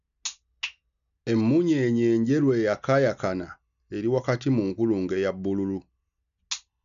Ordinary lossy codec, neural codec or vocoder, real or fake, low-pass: none; none; real; 7.2 kHz